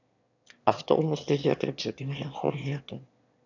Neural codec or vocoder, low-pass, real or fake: autoencoder, 22.05 kHz, a latent of 192 numbers a frame, VITS, trained on one speaker; 7.2 kHz; fake